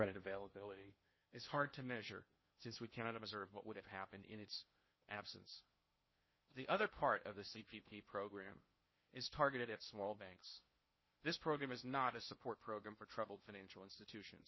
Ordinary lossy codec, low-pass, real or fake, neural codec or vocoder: MP3, 24 kbps; 7.2 kHz; fake; codec, 16 kHz in and 24 kHz out, 0.6 kbps, FocalCodec, streaming, 2048 codes